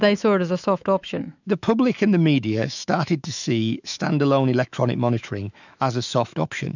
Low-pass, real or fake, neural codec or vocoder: 7.2 kHz; fake; autoencoder, 48 kHz, 128 numbers a frame, DAC-VAE, trained on Japanese speech